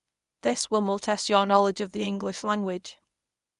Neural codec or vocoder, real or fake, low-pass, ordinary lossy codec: codec, 24 kHz, 0.9 kbps, WavTokenizer, medium speech release version 1; fake; 10.8 kHz; none